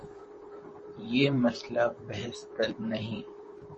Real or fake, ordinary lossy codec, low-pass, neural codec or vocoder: fake; MP3, 32 kbps; 10.8 kHz; vocoder, 44.1 kHz, 128 mel bands, Pupu-Vocoder